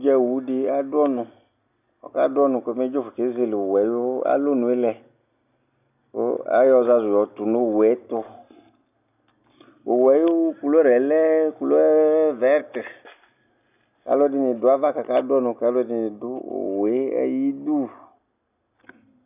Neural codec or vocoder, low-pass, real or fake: none; 3.6 kHz; real